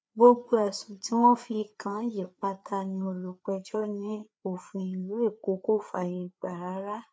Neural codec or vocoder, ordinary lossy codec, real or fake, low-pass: codec, 16 kHz, 4 kbps, FreqCodec, larger model; none; fake; none